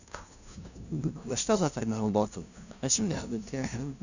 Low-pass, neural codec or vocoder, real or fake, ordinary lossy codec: 7.2 kHz; codec, 16 kHz, 0.5 kbps, FunCodec, trained on LibriTTS, 25 frames a second; fake; none